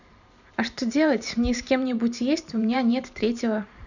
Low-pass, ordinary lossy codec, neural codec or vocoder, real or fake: 7.2 kHz; none; vocoder, 44.1 kHz, 128 mel bands every 512 samples, BigVGAN v2; fake